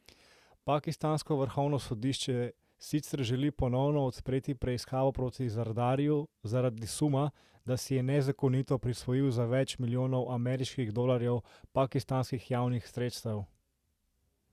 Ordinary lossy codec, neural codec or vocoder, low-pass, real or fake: Opus, 64 kbps; none; 14.4 kHz; real